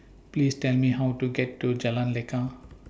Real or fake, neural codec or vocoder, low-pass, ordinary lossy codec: real; none; none; none